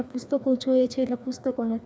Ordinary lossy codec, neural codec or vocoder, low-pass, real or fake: none; codec, 16 kHz, 2 kbps, FreqCodec, larger model; none; fake